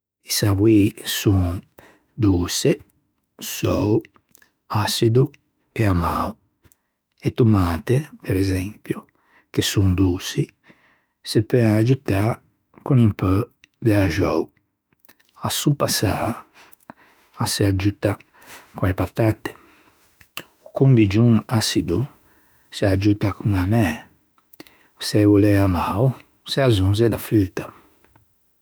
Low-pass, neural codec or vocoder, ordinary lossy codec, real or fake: none; autoencoder, 48 kHz, 32 numbers a frame, DAC-VAE, trained on Japanese speech; none; fake